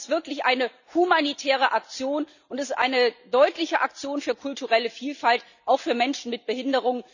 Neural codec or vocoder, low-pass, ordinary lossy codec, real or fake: none; 7.2 kHz; none; real